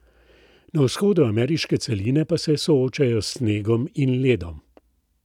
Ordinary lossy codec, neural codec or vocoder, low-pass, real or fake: none; none; 19.8 kHz; real